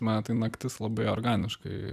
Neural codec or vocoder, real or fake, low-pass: none; real; 14.4 kHz